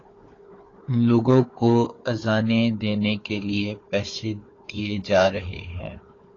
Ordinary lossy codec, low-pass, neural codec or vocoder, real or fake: AAC, 32 kbps; 7.2 kHz; codec, 16 kHz, 4 kbps, FunCodec, trained on Chinese and English, 50 frames a second; fake